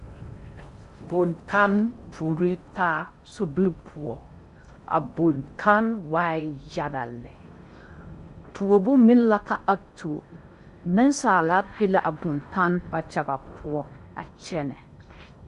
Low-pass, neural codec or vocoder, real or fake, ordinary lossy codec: 10.8 kHz; codec, 16 kHz in and 24 kHz out, 0.6 kbps, FocalCodec, streaming, 4096 codes; fake; Opus, 64 kbps